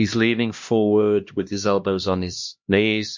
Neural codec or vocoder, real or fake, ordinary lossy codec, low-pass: codec, 16 kHz, 1 kbps, X-Codec, HuBERT features, trained on LibriSpeech; fake; MP3, 48 kbps; 7.2 kHz